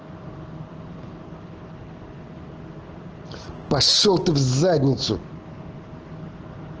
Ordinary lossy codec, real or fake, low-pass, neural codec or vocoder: Opus, 16 kbps; real; 7.2 kHz; none